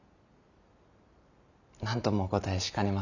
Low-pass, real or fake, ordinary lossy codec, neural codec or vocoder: 7.2 kHz; real; none; none